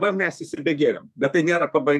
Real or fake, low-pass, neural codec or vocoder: fake; 14.4 kHz; codec, 32 kHz, 1.9 kbps, SNAC